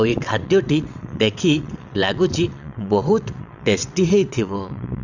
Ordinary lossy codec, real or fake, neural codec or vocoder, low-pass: none; fake; vocoder, 22.05 kHz, 80 mel bands, WaveNeXt; 7.2 kHz